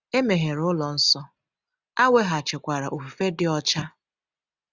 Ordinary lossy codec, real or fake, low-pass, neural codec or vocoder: none; real; 7.2 kHz; none